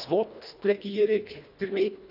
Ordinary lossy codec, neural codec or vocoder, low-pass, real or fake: none; codec, 24 kHz, 1.5 kbps, HILCodec; 5.4 kHz; fake